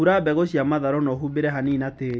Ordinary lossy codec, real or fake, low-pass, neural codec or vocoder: none; real; none; none